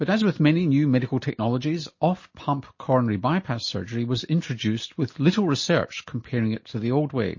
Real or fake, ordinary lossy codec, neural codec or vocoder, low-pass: real; MP3, 32 kbps; none; 7.2 kHz